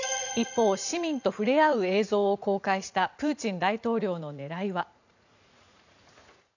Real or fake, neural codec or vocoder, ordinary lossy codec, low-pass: fake; vocoder, 44.1 kHz, 80 mel bands, Vocos; none; 7.2 kHz